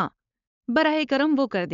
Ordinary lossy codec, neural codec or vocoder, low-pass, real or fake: none; codec, 16 kHz, 4.8 kbps, FACodec; 7.2 kHz; fake